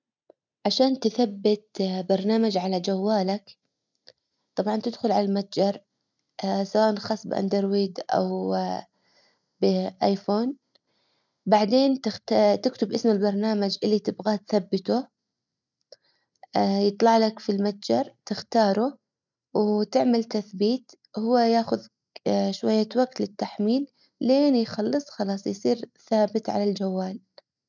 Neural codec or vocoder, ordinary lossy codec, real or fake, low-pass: none; none; real; 7.2 kHz